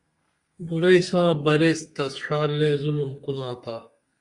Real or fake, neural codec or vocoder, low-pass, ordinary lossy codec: fake; codec, 32 kHz, 1.9 kbps, SNAC; 10.8 kHz; Opus, 64 kbps